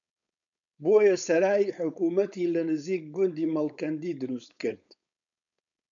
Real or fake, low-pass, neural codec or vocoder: fake; 7.2 kHz; codec, 16 kHz, 4.8 kbps, FACodec